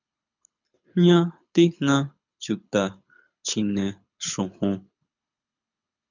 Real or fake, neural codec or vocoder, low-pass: fake; codec, 24 kHz, 6 kbps, HILCodec; 7.2 kHz